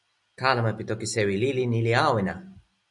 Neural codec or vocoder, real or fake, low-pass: none; real; 10.8 kHz